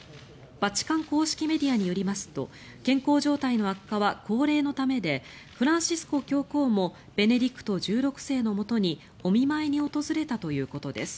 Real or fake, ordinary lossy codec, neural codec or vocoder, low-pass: real; none; none; none